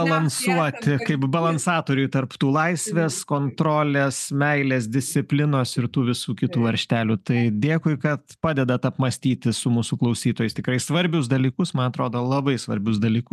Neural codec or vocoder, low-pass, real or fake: none; 14.4 kHz; real